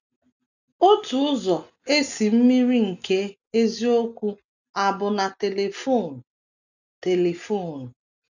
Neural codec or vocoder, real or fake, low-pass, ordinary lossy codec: none; real; 7.2 kHz; none